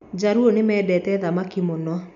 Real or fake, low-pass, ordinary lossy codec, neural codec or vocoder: real; 7.2 kHz; none; none